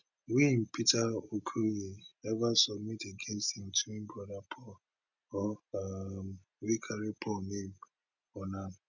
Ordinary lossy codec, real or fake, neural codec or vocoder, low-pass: none; real; none; 7.2 kHz